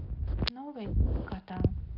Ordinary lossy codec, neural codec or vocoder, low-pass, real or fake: none; none; 5.4 kHz; real